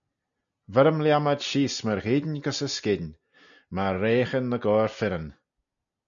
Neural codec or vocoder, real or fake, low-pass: none; real; 7.2 kHz